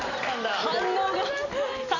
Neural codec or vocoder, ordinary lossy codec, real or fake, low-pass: none; none; real; 7.2 kHz